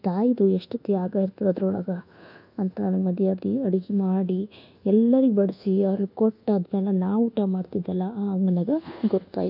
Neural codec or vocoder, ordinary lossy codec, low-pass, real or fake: codec, 24 kHz, 1.2 kbps, DualCodec; none; 5.4 kHz; fake